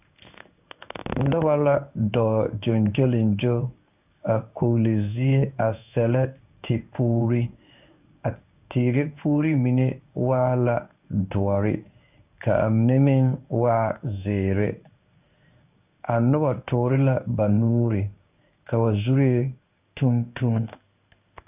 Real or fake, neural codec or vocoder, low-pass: fake; codec, 16 kHz in and 24 kHz out, 1 kbps, XY-Tokenizer; 3.6 kHz